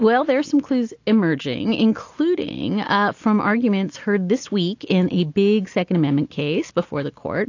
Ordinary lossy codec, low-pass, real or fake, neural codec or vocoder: AAC, 48 kbps; 7.2 kHz; real; none